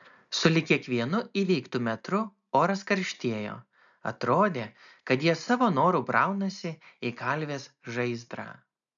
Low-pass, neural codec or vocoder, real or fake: 7.2 kHz; none; real